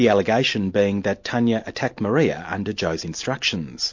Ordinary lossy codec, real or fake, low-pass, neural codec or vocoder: MP3, 48 kbps; real; 7.2 kHz; none